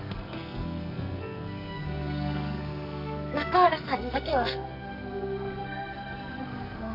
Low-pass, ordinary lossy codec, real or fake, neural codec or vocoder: 5.4 kHz; none; fake; codec, 44.1 kHz, 2.6 kbps, SNAC